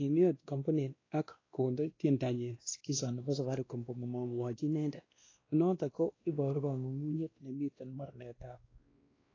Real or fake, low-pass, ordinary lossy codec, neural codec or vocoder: fake; 7.2 kHz; AAC, 32 kbps; codec, 16 kHz, 1 kbps, X-Codec, WavLM features, trained on Multilingual LibriSpeech